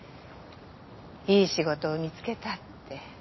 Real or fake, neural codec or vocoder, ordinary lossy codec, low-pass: real; none; MP3, 24 kbps; 7.2 kHz